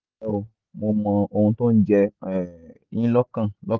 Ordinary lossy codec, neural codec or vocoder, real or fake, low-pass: Opus, 24 kbps; none; real; 7.2 kHz